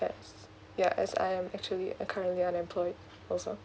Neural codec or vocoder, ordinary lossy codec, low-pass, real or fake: none; none; none; real